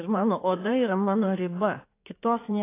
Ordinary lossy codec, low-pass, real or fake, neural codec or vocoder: AAC, 24 kbps; 3.6 kHz; fake; codec, 16 kHz in and 24 kHz out, 2.2 kbps, FireRedTTS-2 codec